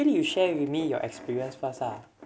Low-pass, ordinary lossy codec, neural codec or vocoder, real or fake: none; none; none; real